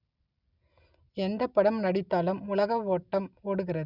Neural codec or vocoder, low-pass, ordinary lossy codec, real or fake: none; 5.4 kHz; none; real